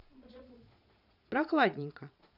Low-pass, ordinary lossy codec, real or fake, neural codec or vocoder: 5.4 kHz; none; fake; vocoder, 22.05 kHz, 80 mel bands, Vocos